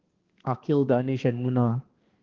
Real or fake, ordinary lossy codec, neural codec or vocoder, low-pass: fake; Opus, 16 kbps; codec, 16 kHz, 2 kbps, X-Codec, HuBERT features, trained on balanced general audio; 7.2 kHz